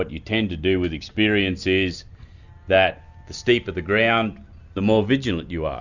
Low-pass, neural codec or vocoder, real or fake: 7.2 kHz; none; real